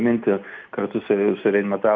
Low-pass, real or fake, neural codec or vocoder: 7.2 kHz; real; none